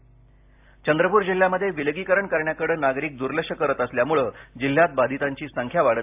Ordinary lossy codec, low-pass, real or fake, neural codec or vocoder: AAC, 32 kbps; 3.6 kHz; real; none